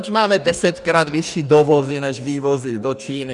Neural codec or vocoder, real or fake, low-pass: codec, 44.1 kHz, 2.6 kbps, DAC; fake; 10.8 kHz